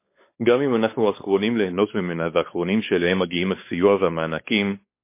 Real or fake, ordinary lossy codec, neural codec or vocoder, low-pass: fake; MP3, 24 kbps; codec, 16 kHz, 4 kbps, X-Codec, WavLM features, trained on Multilingual LibriSpeech; 3.6 kHz